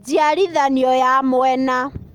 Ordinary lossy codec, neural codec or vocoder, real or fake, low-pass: Opus, 32 kbps; none; real; 19.8 kHz